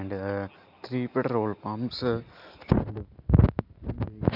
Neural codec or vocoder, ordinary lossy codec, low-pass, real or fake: none; none; 5.4 kHz; real